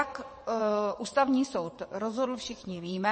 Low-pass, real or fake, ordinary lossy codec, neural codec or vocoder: 9.9 kHz; fake; MP3, 32 kbps; vocoder, 22.05 kHz, 80 mel bands, WaveNeXt